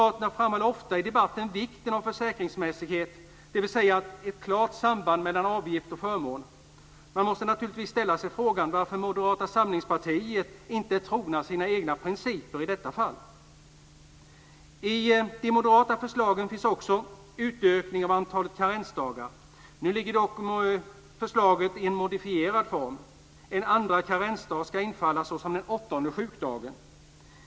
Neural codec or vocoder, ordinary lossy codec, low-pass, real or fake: none; none; none; real